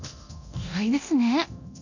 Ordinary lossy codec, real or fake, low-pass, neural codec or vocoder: none; fake; 7.2 kHz; codec, 24 kHz, 0.9 kbps, DualCodec